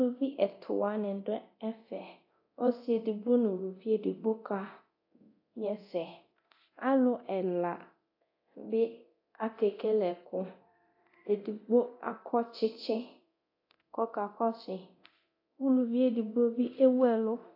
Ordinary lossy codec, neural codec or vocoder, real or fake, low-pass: AAC, 32 kbps; codec, 24 kHz, 0.9 kbps, DualCodec; fake; 5.4 kHz